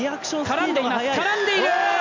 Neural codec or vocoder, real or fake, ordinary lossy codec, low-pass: none; real; MP3, 64 kbps; 7.2 kHz